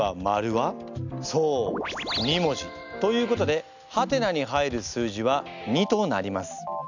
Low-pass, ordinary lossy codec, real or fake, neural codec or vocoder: 7.2 kHz; none; real; none